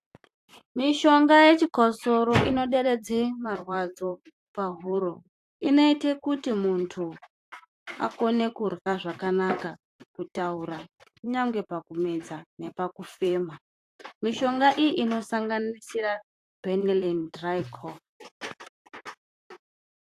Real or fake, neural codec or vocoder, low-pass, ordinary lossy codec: fake; vocoder, 44.1 kHz, 128 mel bands, Pupu-Vocoder; 14.4 kHz; AAC, 64 kbps